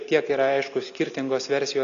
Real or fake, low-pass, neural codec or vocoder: real; 7.2 kHz; none